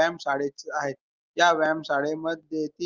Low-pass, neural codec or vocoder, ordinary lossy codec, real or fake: 7.2 kHz; none; Opus, 32 kbps; real